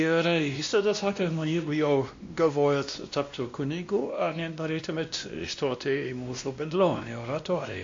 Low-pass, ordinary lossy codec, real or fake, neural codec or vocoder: 7.2 kHz; MP3, 48 kbps; fake; codec, 16 kHz, 1 kbps, X-Codec, WavLM features, trained on Multilingual LibriSpeech